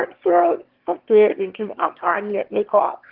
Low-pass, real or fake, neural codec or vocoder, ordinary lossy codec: 5.4 kHz; fake; autoencoder, 22.05 kHz, a latent of 192 numbers a frame, VITS, trained on one speaker; Opus, 16 kbps